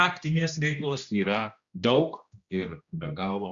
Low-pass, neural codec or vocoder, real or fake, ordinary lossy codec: 7.2 kHz; codec, 16 kHz, 1 kbps, X-Codec, HuBERT features, trained on general audio; fake; Opus, 64 kbps